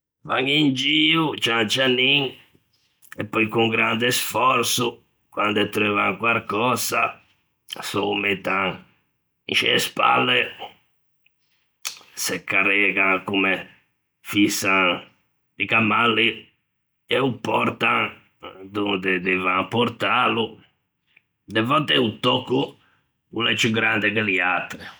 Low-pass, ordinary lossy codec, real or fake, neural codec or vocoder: none; none; real; none